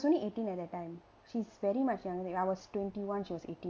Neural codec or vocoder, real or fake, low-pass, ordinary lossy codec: none; real; none; none